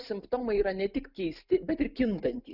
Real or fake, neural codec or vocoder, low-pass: real; none; 5.4 kHz